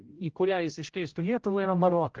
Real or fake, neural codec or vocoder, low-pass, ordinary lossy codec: fake; codec, 16 kHz, 0.5 kbps, X-Codec, HuBERT features, trained on general audio; 7.2 kHz; Opus, 16 kbps